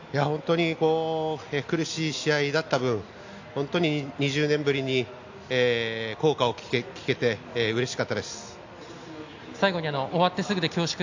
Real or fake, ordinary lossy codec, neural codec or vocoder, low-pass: real; AAC, 48 kbps; none; 7.2 kHz